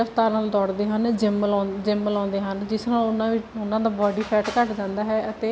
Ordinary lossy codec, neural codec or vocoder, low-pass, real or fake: none; none; none; real